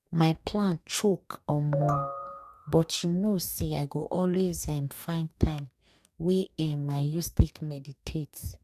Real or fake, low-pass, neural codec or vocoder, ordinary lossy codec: fake; 14.4 kHz; codec, 44.1 kHz, 2.6 kbps, DAC; none